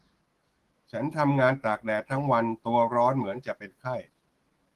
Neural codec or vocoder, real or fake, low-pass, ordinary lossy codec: autoencoder, 48 kHz, 128 numbers a frame, DAC-VAE, trained on Japanese speech; fake; 14.4 kHz; Opus, 16 kbps